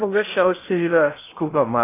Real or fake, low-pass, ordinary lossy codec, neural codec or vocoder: fake; 3.6 kHz; AAC, 24 kbps; codec, 16 kHz in and 24 kHz out, 0.6 kbps, FocalCodec, streaming, 2048 codes